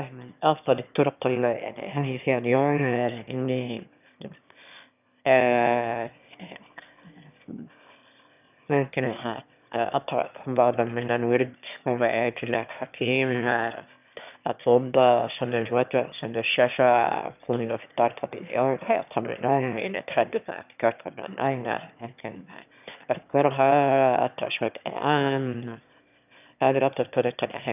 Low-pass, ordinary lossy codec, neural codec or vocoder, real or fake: 3.6 kHz; none; autoencoder, 22.05 kHz, a latent of 192 numbers a frame, VITS, trained on one speaker; fake